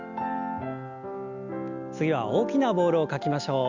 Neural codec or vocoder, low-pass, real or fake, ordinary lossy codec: none; 7.2 kHz; real; Opus, 64 kbps